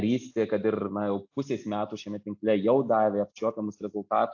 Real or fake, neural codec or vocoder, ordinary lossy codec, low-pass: real; none; AAC, 48 kbps; 7.2 kHz